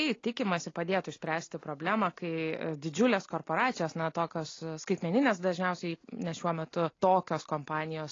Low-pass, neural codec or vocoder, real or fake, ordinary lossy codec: 7.2 kHz; none; real; AAC, 32 kbps